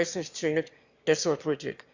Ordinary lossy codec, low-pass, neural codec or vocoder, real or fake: Opus, 64 kbps; 7.2 kHz; autoencoder, 22.05 kHz, a latent of 192 numbers a frame, VITS, trained on one speaker; fake